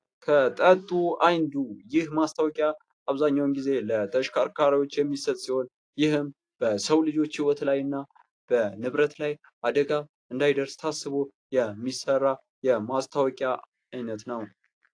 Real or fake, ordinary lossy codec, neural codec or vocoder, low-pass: real; AAC, 48 kbps; none; 9.9 kHz